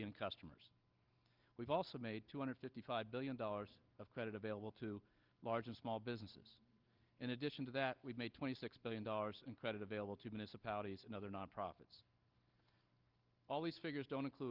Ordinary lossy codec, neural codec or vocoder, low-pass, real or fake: Opus, 32 kbps; none; 5.4 kHz; real